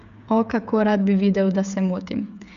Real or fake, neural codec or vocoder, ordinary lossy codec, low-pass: fake; codec, 16 kHz, 8 kbps, FreqCodec, smaller model; none; 7.2 kHz